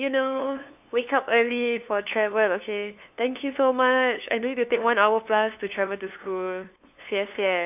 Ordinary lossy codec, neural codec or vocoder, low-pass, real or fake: AAC, 32 kbps; codec, 16 kHz, 2 kbps, FunCodec, trained on LibriTTS, 25 frames a second; 3.6 kHz; fake